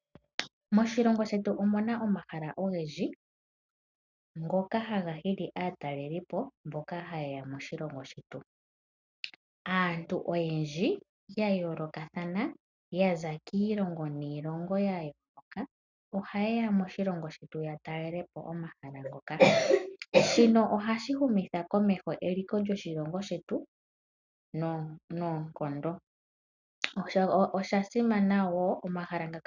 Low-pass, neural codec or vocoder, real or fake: 7.2 kHz; none; real